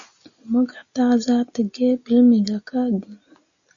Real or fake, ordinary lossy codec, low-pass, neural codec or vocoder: real; MP3, 96 kbps; 7.2 kHz; none